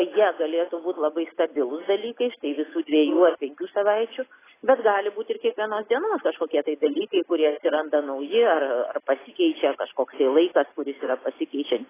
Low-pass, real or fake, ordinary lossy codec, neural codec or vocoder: 3.6 kHz; real; AAC, 16 kbps; none